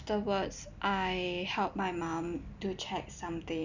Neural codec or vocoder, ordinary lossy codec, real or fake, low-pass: none; none; real; 7.2 kHz